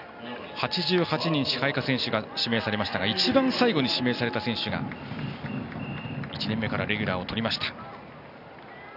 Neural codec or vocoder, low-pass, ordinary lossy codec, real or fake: none; 5.4 kHz; none; real